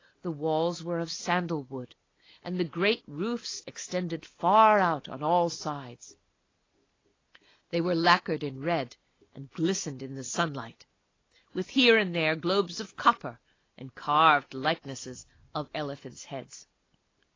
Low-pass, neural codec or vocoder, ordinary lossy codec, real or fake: 7.2 kHz; none; AAC, 32 kbps; real